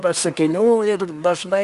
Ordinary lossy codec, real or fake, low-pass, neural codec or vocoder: Opus, 64 kbps; fake; 10.8 kHz; codec, 24 kHz, 1 kbps, SNAC